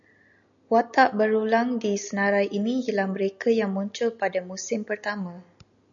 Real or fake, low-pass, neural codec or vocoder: real; 7.2 kHz; none